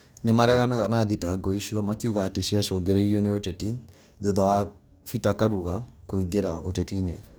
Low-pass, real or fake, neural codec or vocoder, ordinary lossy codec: none; fake; codec, 44.1 kHz, 2.6 kbps, DAC; none